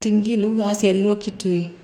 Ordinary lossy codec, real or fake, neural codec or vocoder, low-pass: MP3, 96 kbps; fake; codec, 44.1 kHz, 2.6 kbps, DAC; 19.8 kHz